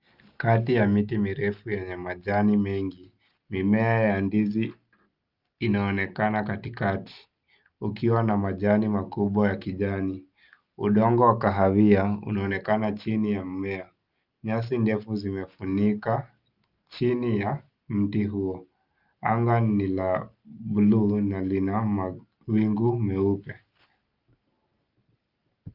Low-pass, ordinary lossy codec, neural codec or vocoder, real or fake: 5.4 kHz; Opus, 24 kbps; none; real